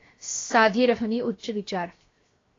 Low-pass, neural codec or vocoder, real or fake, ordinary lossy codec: 7.2 kHz; codec, 16 kHz, 0.7 kbps, FocalCodec; fake; AAC, 32 kbps